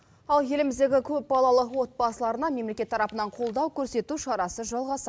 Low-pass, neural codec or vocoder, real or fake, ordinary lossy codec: none; none; real; none